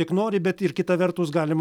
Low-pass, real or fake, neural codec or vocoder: 19.8 kHz; real; none